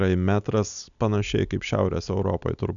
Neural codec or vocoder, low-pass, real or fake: none; 7.2 kHz; real